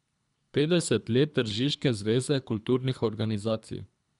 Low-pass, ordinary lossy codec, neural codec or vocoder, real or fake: 10.8 kHz; none; codec, 24 kHz, 3 kbps, HILCodec; fake